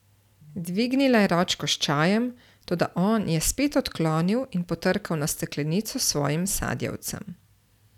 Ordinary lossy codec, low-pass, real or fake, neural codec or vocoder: none; 19.8 kHz; real; none